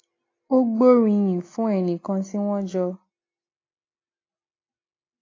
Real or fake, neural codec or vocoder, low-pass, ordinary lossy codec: real; none; 7.2 kHz; AAC, 32 kbps